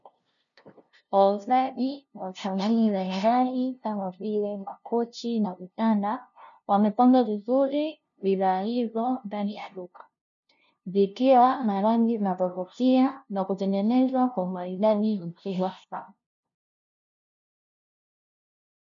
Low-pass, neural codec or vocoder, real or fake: 7.2 kHz; codec, 16 kHz, 0.5 kbps, FunCodec, trained on LibriTTS, 25 frames a second; fake